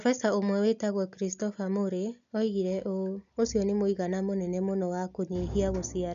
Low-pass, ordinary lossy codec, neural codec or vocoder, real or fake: 7.2 kHz; MP3, 64 kbps; none; real